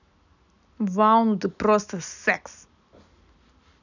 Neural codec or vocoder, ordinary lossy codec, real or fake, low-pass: none; none; real; 7.2 kHz